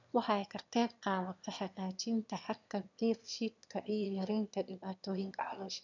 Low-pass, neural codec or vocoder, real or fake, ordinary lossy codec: 7.2 kHz; autoencoder, 22.05 kHz, a latent of 192 numbers a frame, VITS, trained on one speaker; fake; none